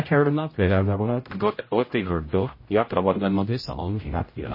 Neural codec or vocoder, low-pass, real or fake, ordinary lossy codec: codec, 16 kHz, 0.5 kbps, X-Codec, HuBERT features, trained on general audio; 5.4 kHz; fake; MP3, 24 kbps